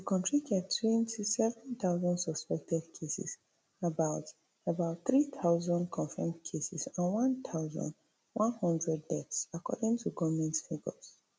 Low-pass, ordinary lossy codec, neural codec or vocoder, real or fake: none; none; none; real